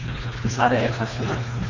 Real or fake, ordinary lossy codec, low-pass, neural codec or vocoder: fake; MP3, 32 kbps; 7.2 kHz; codec, 24 kHz, 1.5 kbps, HILCodec